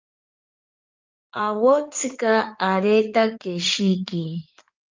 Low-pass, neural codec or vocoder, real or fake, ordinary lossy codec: 7.2 kHz; codec, 16 kHz in and 24 kHz out, 2.2 kbps, FireRedTTS-2 codec; fake; Opus, 24 kbps